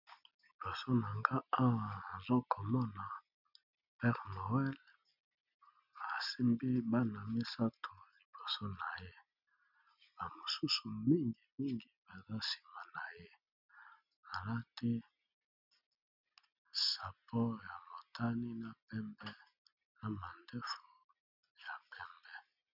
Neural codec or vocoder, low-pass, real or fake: none; 5.4 kHz; real